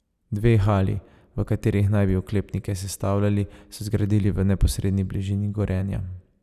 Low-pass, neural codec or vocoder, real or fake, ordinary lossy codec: 14.4 kHz; none; real; none